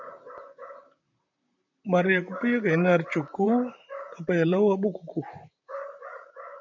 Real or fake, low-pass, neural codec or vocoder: fake; 7.2 kHz; vocoder, 44.1 kHz, 128 mel bands, Pupu-Vocoder